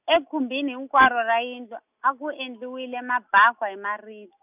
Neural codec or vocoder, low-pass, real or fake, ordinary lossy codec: none; 3.6 kHz; real; none